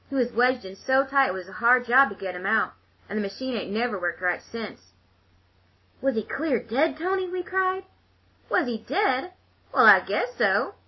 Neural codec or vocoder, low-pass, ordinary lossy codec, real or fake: none; 7.2 kHz; MP3, 24 kbps; real